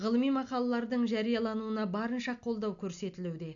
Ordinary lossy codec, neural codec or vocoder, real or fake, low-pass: none; none; real; 7.2 kHz